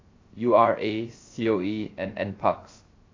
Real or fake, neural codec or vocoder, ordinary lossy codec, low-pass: fake; codec, 16 kHz, 0.3 kbps, FocalCodec; AAC, 32 kbps; 7.2 kHz